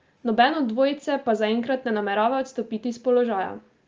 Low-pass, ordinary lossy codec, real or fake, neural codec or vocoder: 7.2 kHz; Opus, 24 kbps; real; none